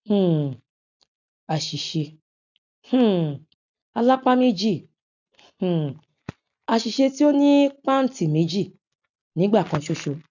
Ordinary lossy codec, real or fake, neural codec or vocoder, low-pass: none; real; none; 7.2 kHz